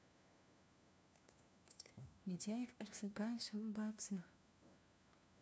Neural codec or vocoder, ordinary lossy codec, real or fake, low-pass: codec, 16 kHz, 1 kbps, FunCodec, trained on LibriTTS, 50 frames a second; none; fake; none